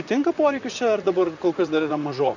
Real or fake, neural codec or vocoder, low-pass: fake; vocoder, 44.1 kHz, 128 mel bands, Pupu-Vocoder; 7.2 kHz